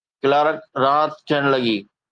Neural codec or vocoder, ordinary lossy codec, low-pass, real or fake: none; Opus, 32 kbps; 9.9 kHz; real